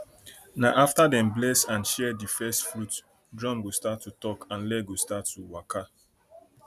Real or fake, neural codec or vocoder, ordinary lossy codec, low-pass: real; none; none; 14.4 kHz